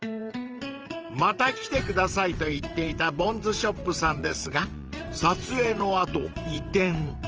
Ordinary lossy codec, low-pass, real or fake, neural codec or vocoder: Opus, 24 kbps; 7.2 kHz; fake; vocoder, 22.05 kHz, 80 mel bands, WaveNeXt